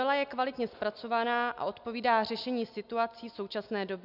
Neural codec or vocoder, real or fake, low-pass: none; real; 5.4 kHz